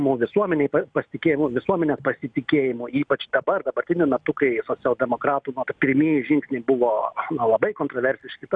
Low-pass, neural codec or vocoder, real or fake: 9.9 kHz; none; real